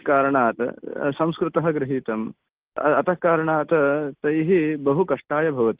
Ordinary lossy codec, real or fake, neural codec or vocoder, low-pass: Opus, 16 kbps; real; none; 3.6 kHz